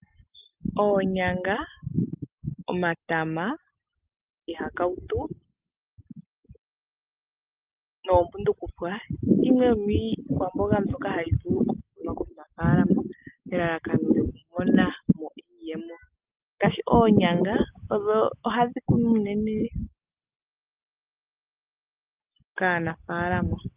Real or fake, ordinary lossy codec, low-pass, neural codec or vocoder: real; Opus, 24 kbps; 3.6 kHz; none